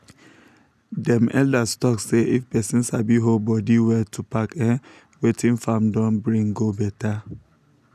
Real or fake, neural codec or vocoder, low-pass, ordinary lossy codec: real; none; 14.4 kHz; none